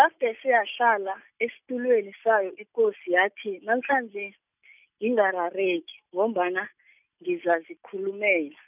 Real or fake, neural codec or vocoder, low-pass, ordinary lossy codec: real; none; 3.6 kHz; none